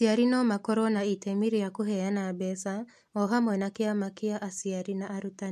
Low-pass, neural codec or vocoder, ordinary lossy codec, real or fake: 14.4 kHz; none; MP3, 64 kbps; real